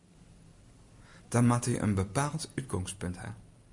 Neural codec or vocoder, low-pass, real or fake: none; 10.8 kHz; real